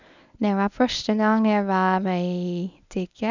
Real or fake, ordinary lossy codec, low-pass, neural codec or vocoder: fake; none; 7.2 kHz; codec, 24 kHz, 0.9 kbps, WavTokenizer, medium speech release version 1